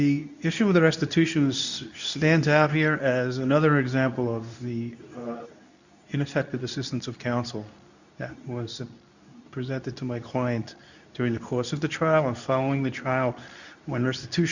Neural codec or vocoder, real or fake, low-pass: codec, 24 kHz, 0.9 kbps, WavTokenizer, medium speech release version 2; fake; 7.2 kHz